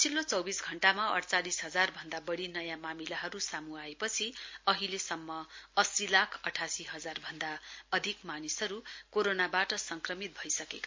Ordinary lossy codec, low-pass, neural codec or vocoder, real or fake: MP3, 48 kbps; 7.2 kHz; none; real